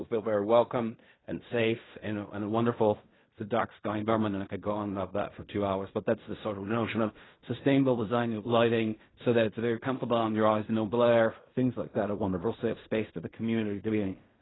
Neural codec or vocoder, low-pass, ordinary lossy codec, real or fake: codec, 16 kHz in and 24 kHz out, 0.4 kbps, LongCat-Audio-Codec, fine tuned four codebook decoder; 7.2 kHz; AAC, 16 kbps; fake